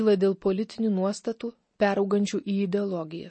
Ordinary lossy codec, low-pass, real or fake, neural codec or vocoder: MP3, 32 kbps; 9.9 kHz; real; none